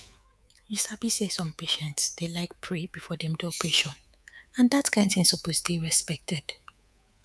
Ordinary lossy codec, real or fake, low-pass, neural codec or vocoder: none; fake; none; codec, 24 kHz, 3.1 kbps, DualCodec